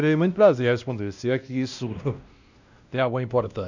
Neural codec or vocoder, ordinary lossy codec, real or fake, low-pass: codec, 16 kHz, 1 kbps, X-Codec, WavLM features, trained on Multilingual LibriSpeech; none; fake; 7.2 kHz